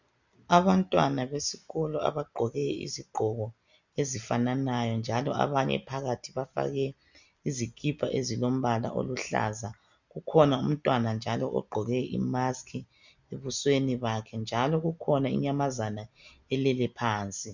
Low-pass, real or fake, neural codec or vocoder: 7.2 kHz; real; none